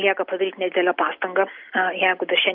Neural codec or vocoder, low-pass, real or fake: none; 5.4 kHz; real